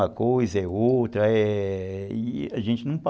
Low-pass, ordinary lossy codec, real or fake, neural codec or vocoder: none; none; real; none